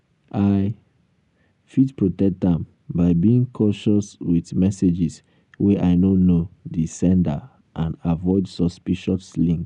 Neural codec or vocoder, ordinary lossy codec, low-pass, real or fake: none; none; 10.8 kHz; real